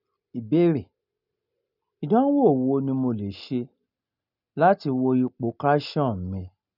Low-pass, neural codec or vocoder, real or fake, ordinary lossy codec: 5.4 kHz; none; real; none